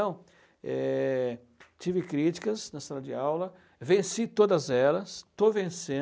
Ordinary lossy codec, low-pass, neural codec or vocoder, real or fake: none; none; none; real